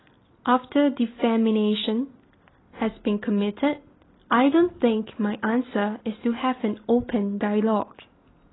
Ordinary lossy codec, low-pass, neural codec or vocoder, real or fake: AAC, 16 kbps; 7.2 kHz; none; real